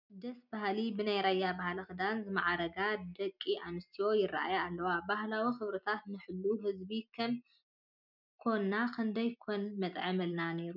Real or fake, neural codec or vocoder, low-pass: real; none; 5.4 kHz